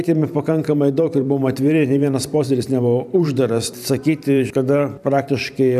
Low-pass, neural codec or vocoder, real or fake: 14.4 kHz; vocoder, 44.1 kHz, 128 mel bands every 512 samples, BigVGAN v2; fake